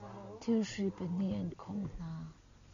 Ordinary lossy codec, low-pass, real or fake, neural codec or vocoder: AAC, 64 kbps; 7.2 kHz; real; none